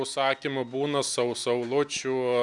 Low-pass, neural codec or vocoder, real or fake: 10.8 kHz; none; real